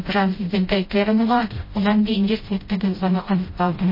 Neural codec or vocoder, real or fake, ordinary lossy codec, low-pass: codec, 16 kHz, 0.5 kbps, FreqCodec, smaller model; fake; MP3, 24 kbps; 5.4 kHz